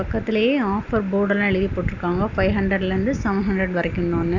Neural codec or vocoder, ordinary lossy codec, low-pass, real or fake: none; none; 7.2 kHz; real